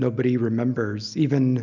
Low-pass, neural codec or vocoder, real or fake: 7.2 kHz; none; real